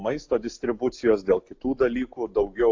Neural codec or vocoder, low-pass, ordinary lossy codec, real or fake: none; 7.2 kHz; AAC, 48 kbps; real